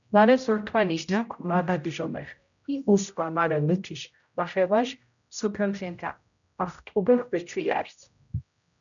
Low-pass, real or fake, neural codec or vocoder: 7.2 kHz; fake; codec, 16 kHz, 0.5 kbps, X-Codec, HuBERT features, trained on general audio